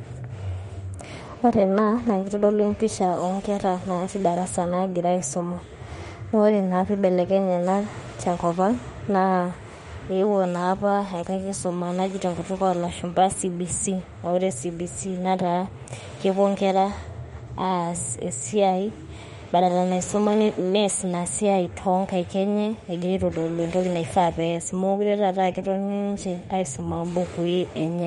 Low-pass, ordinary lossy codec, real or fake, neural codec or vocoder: 19.8 kHz; MP3, 48 kbps; fake; autoencoder, 48 kHz, 32 numbers a frame, DAC-VAE, trained on Japanese speech